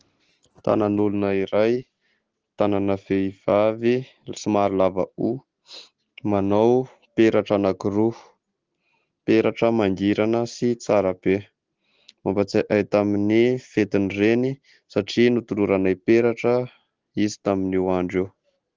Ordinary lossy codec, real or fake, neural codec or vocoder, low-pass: Opus, 16 kbps; real; none; 7.2 kHz